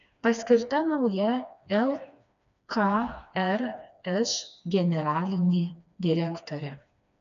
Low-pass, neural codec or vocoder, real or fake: 7.2 kHz; codec, 16 kHz, 2 kbps, FreqCodec, smaller model; fake